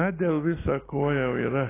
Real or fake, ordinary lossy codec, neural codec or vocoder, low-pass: real; AAC, 16 kbps; none; 3.6 kHz